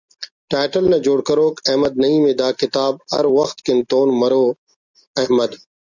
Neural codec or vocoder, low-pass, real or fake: none; 7.2 kHz; real